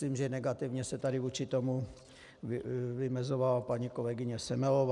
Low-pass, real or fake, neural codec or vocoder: 10.8 kHz; real; none